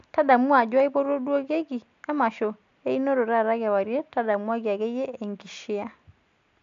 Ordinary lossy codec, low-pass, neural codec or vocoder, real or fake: AAC, 64 kbps; 7.2 kHz; none; real